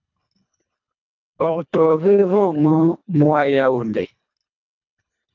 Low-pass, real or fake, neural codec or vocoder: 7.2 kHz; fake; codec, 24 kHz, 1.5 kbps, HILCodec